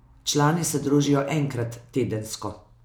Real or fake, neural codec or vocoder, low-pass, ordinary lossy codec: real; none; none; none